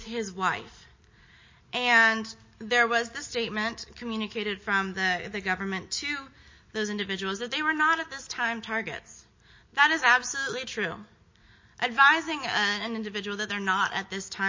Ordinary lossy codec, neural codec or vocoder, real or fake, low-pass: MP3, 32 kbps; none; real; 7.2 kHz